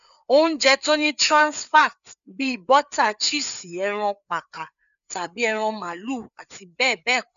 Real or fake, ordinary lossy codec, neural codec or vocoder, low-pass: fake; MP3, 96 kbps; codec, 16 kHz, 4 kbps, FreqCodec, larger model; 7.2 kHz